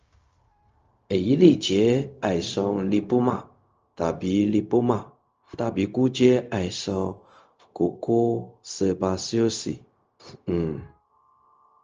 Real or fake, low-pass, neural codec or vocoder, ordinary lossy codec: fake; 7.2 kHz; codec, 16 kHz, 0.4 kbps, LongCat-Audio-Codec; Opus, 24 kbps